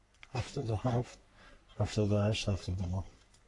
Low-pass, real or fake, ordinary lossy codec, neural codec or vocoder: 10.8 kHz; fake; AAC, 48 kbps; codec, 44.1 kHz, 3.4 kbps, Pupu-Codec